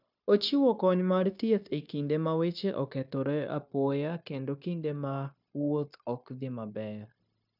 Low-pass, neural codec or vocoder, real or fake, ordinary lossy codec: 5.4 kHz; codec, 16 kHz, 0.9 kbps, LongCat-Audio-Codec; fake; none